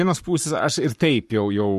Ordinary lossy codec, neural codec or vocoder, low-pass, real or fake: MP3, 64 kbps; codec, 44.1 kHz, 7.8 kbps, Pupu-Codec; 14.4 kHz; fake